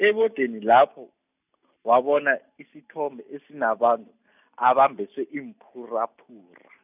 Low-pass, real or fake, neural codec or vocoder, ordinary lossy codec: 3.6 kHz; real; none; none